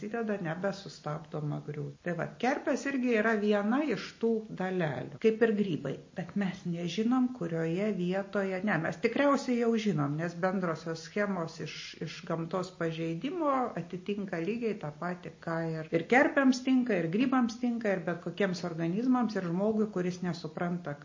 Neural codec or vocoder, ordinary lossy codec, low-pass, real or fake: none; MP3, 32 kbps; 7.2 kHz; real